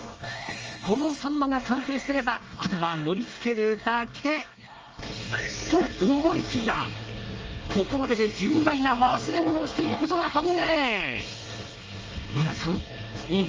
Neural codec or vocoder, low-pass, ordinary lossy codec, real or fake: codec, 24 kHz, 1 kbps, SNAC; 7.2 kHz; Opus, 24 kbps; fake